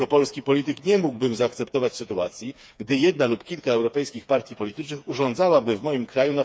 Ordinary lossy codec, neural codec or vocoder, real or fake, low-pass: none; codec, 16 kHz, 4 kbps, FreqCodec, smaller model; fake; none